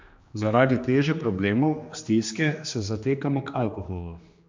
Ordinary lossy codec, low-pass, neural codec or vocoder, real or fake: MP3, 64 kbps; 7.2 kHz; codec, 16 kHz, 2 kbps, X-Codec, HuBERT features, trained on general audio; fake